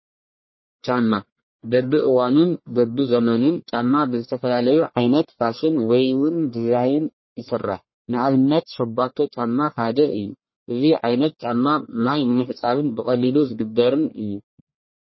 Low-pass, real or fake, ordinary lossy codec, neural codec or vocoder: 7.2 kHz; fake; MP3, 24 kbps; codec, 44.1 kHz, 1.7 kbps, Pupu-Codec